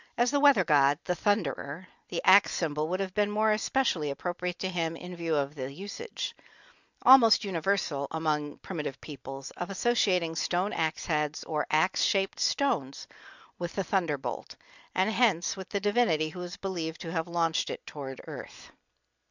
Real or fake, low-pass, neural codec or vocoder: real; 7.2 kHz; none